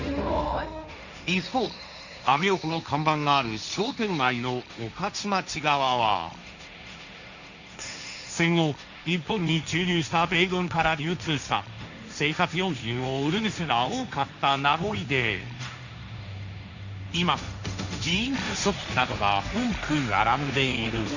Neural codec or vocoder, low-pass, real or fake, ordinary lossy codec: codec, 16 kHz, 1.1 kbps, Voila-Tokenizer; 7.2 kHz; fake; none